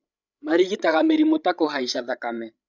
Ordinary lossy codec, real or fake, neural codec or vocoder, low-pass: none; fake; codec, 16 kHz, 16 kbps, FreqCodec, larger model; 7.2 kHz